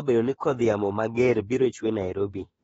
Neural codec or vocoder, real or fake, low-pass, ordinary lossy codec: autoencoder, 48 kHz, 32 numbers a frame, DAC-VAE, trained on Japanese speech; fake; 19.8 kHz; AAC, 24 kbps